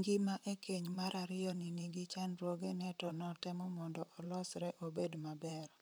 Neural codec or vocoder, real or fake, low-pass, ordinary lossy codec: vocoder, 44.1 kHz, 128 mel bands, Pupu-Vocoder; fake; none; none